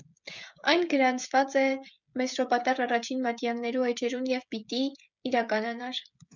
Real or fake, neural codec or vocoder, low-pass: fake; codec, 16 kHz, 16 kbps, FreqCodec, smaller model; 7.2 kHz